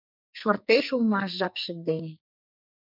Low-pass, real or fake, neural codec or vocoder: 5.4 kHz; fake; codec, 44.1 kHz, 2.6 kbps, SNAC